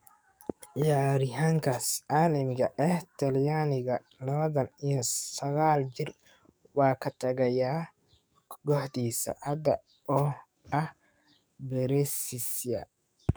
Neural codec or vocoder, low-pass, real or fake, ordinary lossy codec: codec, 44.1 kHz, 7.8 kbps, DAC; none; fake; none